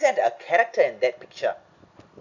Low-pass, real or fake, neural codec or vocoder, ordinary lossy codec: 7.2 kHz; real; none; none